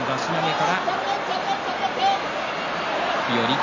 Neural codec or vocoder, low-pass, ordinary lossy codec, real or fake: none; 7.2 kHz; none; real